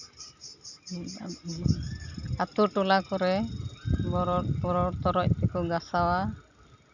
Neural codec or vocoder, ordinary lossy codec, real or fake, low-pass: none; none; real; 7.2 kHz